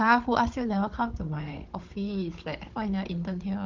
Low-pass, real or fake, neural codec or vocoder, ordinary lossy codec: 7.2 kHz; fake; codec, 16 kHz, 16 kbps, FunCodec, trained on Chinese and English, 50 frames a second; Opus, 16 kbps